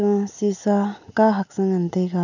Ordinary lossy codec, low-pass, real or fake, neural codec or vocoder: none; 7.2 kHz; real; none